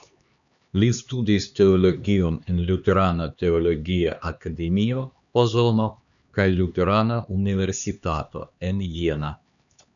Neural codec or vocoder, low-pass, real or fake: codec, 16 kHz, 2 kbps, X-Codec, HuBERT features, trained on LibriSpeech; 7.2 kHz; fake